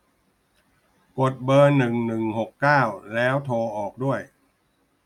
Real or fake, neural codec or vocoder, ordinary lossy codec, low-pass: real; none; none; 19.8 kHz